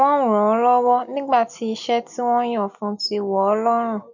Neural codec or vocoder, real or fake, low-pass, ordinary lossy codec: none; real; 7.2 kHz; none